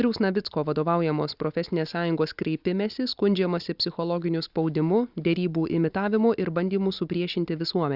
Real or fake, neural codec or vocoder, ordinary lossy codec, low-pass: real; none; Opus, 64 kbps; 5.4 kHz